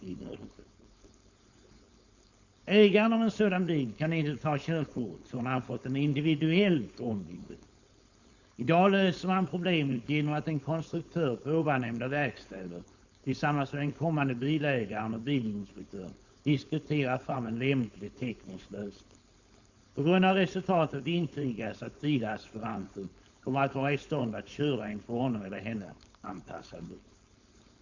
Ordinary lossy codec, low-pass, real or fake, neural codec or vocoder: Opus, 64 kbps; 7.2 kHz; fake; codec, 16 kHz, 4.8 kbps, FACodec